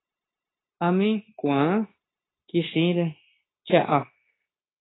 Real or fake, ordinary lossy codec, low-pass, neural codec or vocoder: fake; AAC, 16 kbps; 7.2 kHz; codec, 16 kHz, 0.9 kbps, LongCat-Audio-Codec